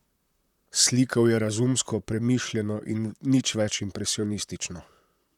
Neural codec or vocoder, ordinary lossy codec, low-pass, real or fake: vocoder, 44.1 kHz, 128 mel bands, Pupu-Vocoder; none; 19.8 kHz; fake